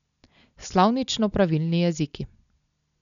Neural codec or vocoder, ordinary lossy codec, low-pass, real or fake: none; none; 7.2 kHz; real